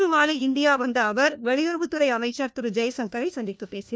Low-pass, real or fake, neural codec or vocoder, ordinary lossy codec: none; fake; codec, 16 kHz, 1 kbps, FunCodec, trained on LibriTTS, 50 frames a second; none